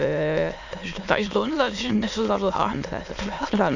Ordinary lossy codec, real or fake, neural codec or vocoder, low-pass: none; fake; autoencoder, 22.05 kHz, a latent of 192 numbers a frame, VITS, trained on many speakers; 7.2 kHz